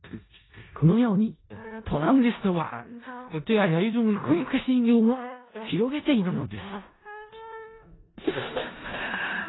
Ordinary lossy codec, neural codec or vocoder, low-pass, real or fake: AAC, 16 kbps; codec, 16 kHz in and 24 kHz out, 0.4 kbps, LongCat-Audio-Codec, four codebook decoder; 7.2 kHz; fake